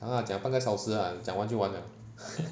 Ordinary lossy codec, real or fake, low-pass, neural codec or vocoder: none; real; none; none